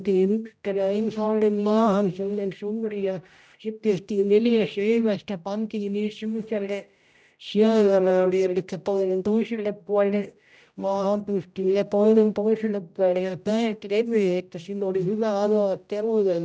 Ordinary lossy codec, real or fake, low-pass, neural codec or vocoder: none; fake; none; codec, 16 kHz, 0.5 kbps, X-Codec, HuBERT features, trained on general audio